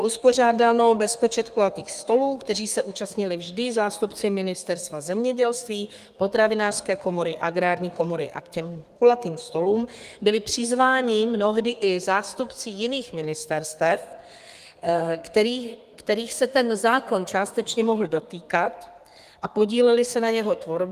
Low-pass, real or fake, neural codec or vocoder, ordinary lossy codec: 14.4 kHz; fake; codec, 32 kHz, 1.9 kbps, SNAC; Opus, 32 kbps